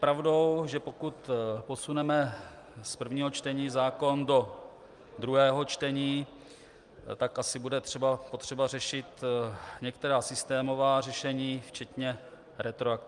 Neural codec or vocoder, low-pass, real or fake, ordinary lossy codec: none; 10.8 kHz; real; Opus, 32 kbps